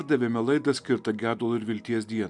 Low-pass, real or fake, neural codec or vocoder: 10.8 kHz; real; none